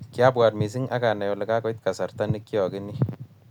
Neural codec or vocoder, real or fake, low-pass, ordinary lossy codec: none; real; 19.8 kHz; none